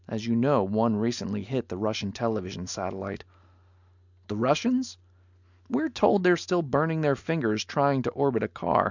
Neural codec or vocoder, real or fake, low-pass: none; real; 7.2 kHz